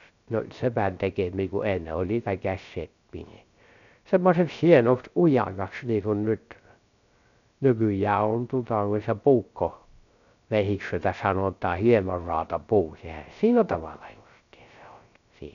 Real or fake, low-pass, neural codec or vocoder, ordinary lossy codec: fake; 7.2 kHz; codec, 16 kHz, 0.3 kbps, FocalCodec; none